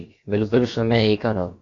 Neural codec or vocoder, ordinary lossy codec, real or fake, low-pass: codec, 16 kHz, about 1 kbps, DyCAST, with the encoder's durations; MP3, 48 kbps; fake; 7.2 kHz